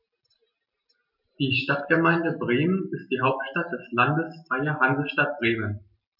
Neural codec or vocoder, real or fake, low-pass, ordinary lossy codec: none; real; 5.4 kHz; none